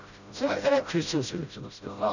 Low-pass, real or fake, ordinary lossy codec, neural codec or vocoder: 7.2 kHz; fake; none; codec, 16 kHz, 0.5 kbps, FreqCodec, smaller model